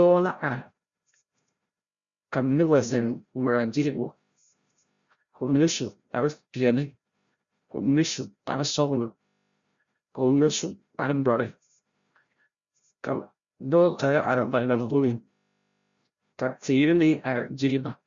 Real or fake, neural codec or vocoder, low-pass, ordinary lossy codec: fake; codec, 16 kHz, 0.5 kbps, FreqCodec, larger model; 7.2 kHz; Opus, 64 kbps